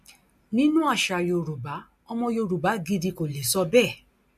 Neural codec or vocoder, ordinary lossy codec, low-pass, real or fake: none; MP3, 64 kbps; 14.4 kHz; real